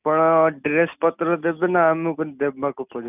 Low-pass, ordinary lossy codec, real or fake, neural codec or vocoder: 3.6 kHz; none; real; none